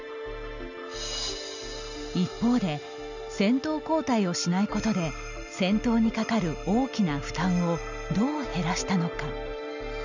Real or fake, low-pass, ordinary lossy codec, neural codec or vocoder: real; 7.2 kHz; none; none